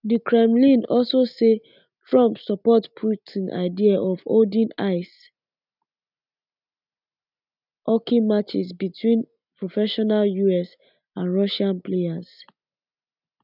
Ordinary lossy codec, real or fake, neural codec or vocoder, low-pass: none; real; none; 5.4 kHz